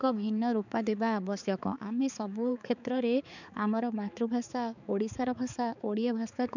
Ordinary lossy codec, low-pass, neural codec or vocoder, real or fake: none; 7.2 kHz; codec, 16 kHz, 4 kbps, X-Codec, HuBERT features, trained on balanced general audio; fake